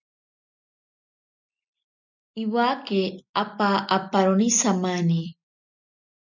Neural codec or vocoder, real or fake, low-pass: none; real; 7.2 kHz